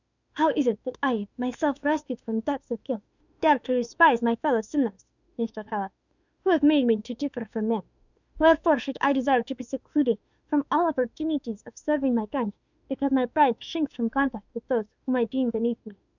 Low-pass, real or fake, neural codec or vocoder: 7.2 kHz; fake; autoencoder, 48 kHz, 32 numbers a frame, DAC-VAE, trained on Japanese speech